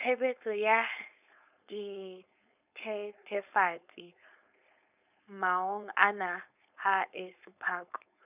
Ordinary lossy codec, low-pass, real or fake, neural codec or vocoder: none; 3.6 kHz; fake; codec, 16 kHz, 4.8 kbps, FACodec